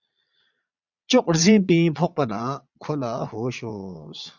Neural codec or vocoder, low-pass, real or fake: vocoder, 22.05 kHz, 80 mel bands, Vocos; 7.2 kHz; fake